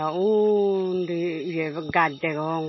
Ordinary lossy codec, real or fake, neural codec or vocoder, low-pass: MP3, 24 kbps; real; none; 7.2 kHz